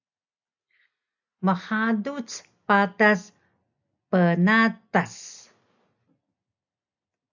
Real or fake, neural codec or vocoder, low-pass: real; none; 7.2 kHz